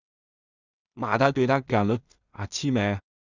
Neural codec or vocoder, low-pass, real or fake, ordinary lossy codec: codec, 16 kHz in and 24 kHz out, 0.4 kbps, LongCat-Audio-Codec, two codebook decoder; 7.2 kHz; fake; Opus, 64 kbps